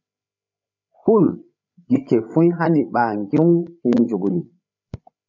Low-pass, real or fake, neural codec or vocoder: 7.2 kHz; fake; codec, 16 kHz, 8 kbps, FreqCodec, larger model